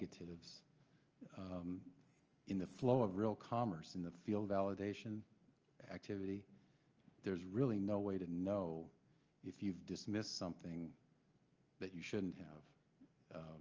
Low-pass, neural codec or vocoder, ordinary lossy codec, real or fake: 7.2 kHz; none; Opus, 24 kbps; real